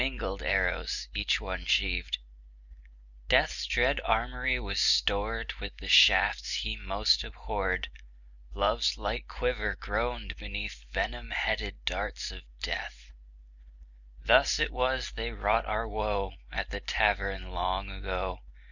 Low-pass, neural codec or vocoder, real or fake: 7.2 kHz; none; real